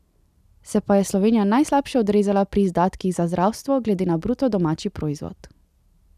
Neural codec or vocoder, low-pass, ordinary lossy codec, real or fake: none; 14.4 kHz; none; real